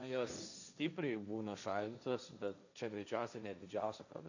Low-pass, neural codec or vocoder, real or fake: 7.2 kHz; codec, 16 kHz, 1.1 kbps, Voila-Tokenizer; fake